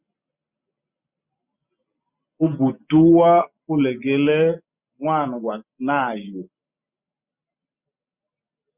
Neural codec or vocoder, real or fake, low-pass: none; real; 3.6 kHz